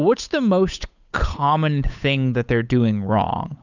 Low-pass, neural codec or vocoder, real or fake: 7.2 kHz; codec, 16 kHz, 8 kbps, FunCodec, trained on Chinese and English, 25 frames a second; fake